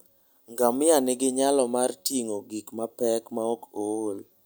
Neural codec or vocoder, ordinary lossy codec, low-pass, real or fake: none; none; none; real